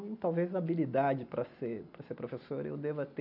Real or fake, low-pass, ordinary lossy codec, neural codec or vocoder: real; 5.4 kHz; none; none